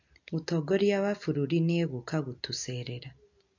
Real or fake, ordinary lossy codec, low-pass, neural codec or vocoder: real; MP3, 48 kbps; 7.2 kHz; none